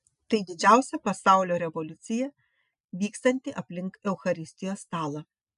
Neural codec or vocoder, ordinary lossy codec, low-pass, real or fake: none; MP3, 96 kbps; 10.8 kHz; real